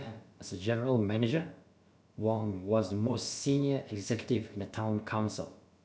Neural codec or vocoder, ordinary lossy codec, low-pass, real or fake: codec, 16 kHz, about 1 kbps, DyCAST, with the encoder's durations; none; none; fake